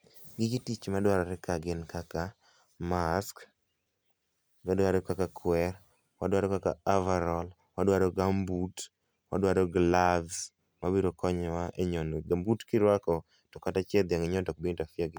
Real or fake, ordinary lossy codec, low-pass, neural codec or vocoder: real; none; none; none